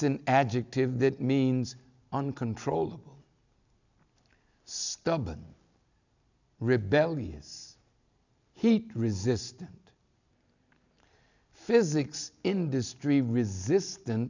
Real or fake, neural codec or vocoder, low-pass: real; none; 7.2 kHz